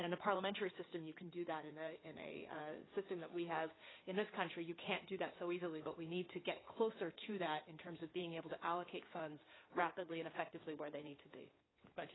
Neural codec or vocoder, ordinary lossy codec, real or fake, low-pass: codec, 16 kHz in and 24 kHz out, 2.2 kbps, FireRedTTS-2 codec; AAC, 16 kbps; fake; 7.2 kHz